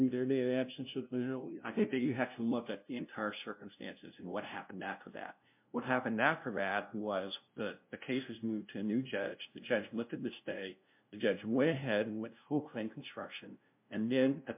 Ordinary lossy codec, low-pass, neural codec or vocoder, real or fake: MP3, 32 kbps; 3.6 kHz; codec, 16 kHz, 0.5 kbps, FunCodec, trained on LibriTTS, 25 frames a second; fake